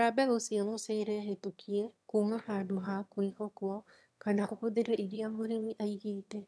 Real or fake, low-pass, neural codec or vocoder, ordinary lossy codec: fake; none; autoencoder, 22.05 kHz, a latent of 192 numbers a frame, VITS, trained on one speaker; none